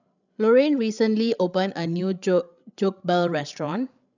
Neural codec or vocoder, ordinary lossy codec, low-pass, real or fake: codec, 16 kHz, 16 kbps, FreqCodec, larger model; none; 7.2 kHz; fake